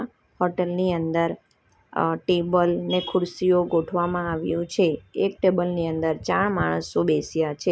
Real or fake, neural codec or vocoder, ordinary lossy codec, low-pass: real; none; none; none